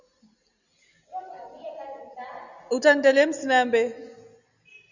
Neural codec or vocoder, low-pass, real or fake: none; 7.2 kHz; real